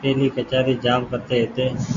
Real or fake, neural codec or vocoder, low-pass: real; none; 7.2 kHz